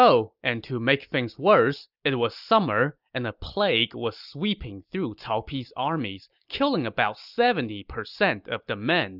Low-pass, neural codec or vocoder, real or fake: 5.4 kHz; none; real